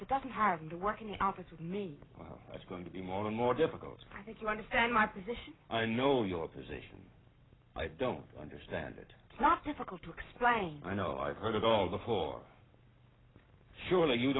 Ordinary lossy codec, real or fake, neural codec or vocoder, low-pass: AAC, 16 kbps; fake; codec, 44.1 kHz, 7.8 kbps, Pupu-Codec; 7.2 kHz